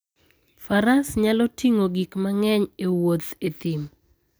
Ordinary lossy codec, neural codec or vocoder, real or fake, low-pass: none; none; real; none